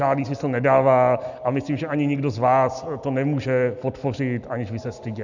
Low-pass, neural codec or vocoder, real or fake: 7.2 kHz; none; real